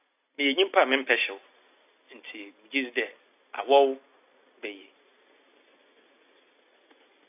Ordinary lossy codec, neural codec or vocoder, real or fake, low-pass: AAC, 32 kbps; none; real; 3.6 kHz